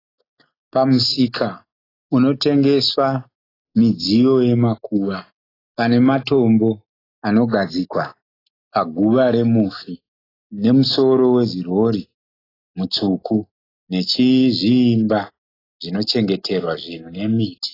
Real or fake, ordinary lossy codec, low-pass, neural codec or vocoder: real; AAC, 24 kbps; 5.4 kHz; none